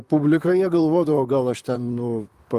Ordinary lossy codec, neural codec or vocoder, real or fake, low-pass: Opus, 32 kbps; vocoder, 44.1 kHz, 128 mel bands, Pupu-Vocoder; fake; 14.4 kHz